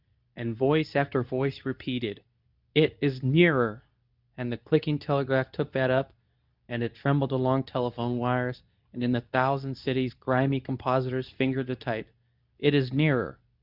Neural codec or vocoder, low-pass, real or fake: codec, 24 kHz, 0.9 kbps, WavTokenizer, medium speech release version 2; 5.4 kHz; fake